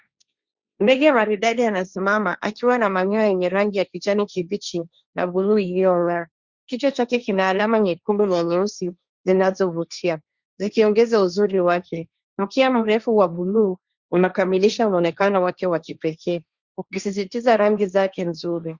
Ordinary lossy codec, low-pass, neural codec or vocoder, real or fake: Opus, 64 kbps; 7.2 kHz; codec, 16 kHz, 1.1 kbps, Voila-Tokenizer; fake